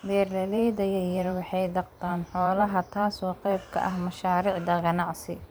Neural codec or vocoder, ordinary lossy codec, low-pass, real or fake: vocoder, 44.1 kHz, 128 mel bands every 512 samples, BigVGAN v2; none; none; fake